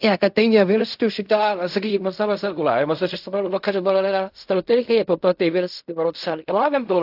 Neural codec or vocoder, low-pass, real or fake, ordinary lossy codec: codec, 16 kHz in and 24 kHz out, 0.4 kbps, LongCat-Audio-Codec, fine tuned four codebook decoder; 5.4 kHz; fake; AAC, 48 kbps